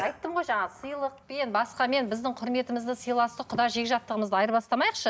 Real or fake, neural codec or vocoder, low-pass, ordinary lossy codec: real; none; none; none